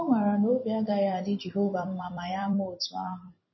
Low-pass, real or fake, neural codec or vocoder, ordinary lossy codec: 7.2 kHz; fake; vocoder, 44.1 kHz, 128 mel bands every 256 samples, BigVGAN v2; MP3, 24 kbps